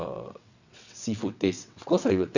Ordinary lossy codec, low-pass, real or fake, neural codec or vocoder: none; 7.2 kHz; fake; vocoder, 22.05 kHz, 80 mel bands, WaveNeXt